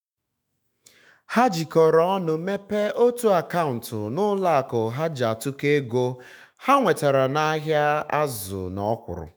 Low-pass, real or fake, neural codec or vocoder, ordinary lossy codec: none; fake; autoencoder, 48 kHz, 128 numbers a frame, DAC-VAE, trained on Japanese speech; none